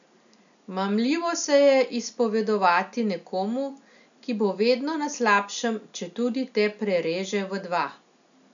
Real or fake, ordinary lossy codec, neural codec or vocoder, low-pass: real; none; none; 7.2 kHz